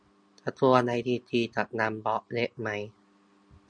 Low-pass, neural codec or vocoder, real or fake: 9.9 kHz; none; real